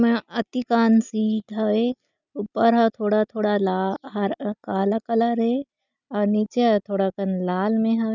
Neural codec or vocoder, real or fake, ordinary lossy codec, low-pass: none; real; none; 7.2 kHz